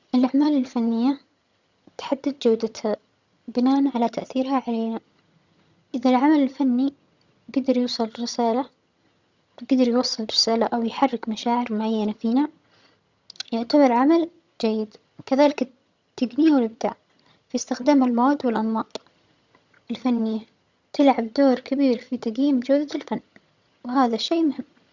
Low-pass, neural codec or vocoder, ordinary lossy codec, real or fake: 7.2 kHz; vocoder, 22.05 kHz, 80 mel bands, HiFi-GAN; Opus, 64 kbps; fake